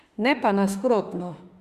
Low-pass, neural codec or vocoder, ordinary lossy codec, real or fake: 14.4 kHz; autoencoder, 48 kHz, 32 numbers a frame, DAC-VAE, trained on Japanese speech; Opus, 64 kbps; fake